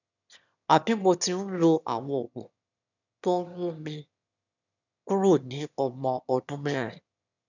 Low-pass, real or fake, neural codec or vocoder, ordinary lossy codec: 7.2 kHz; fake; autoencoder, 22.05 kHz, a latent of 192 numbers a frame, VITS, trained on one speaker; none